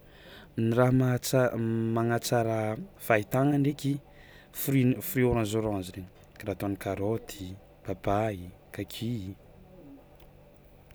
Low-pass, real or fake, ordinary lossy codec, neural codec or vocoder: none; real; none; none